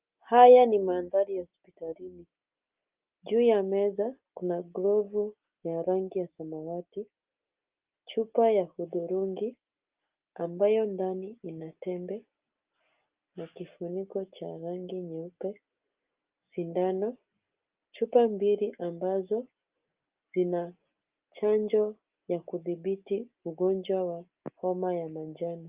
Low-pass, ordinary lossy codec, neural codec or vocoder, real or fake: 3.6 kHz; Opus, 24 kbps; none; real